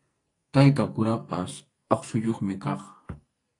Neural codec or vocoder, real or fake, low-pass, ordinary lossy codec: codec, 44.1 kHz, 2.6 kbps, SNAC; fake; 10.8 kHz; AAC, 48 kbps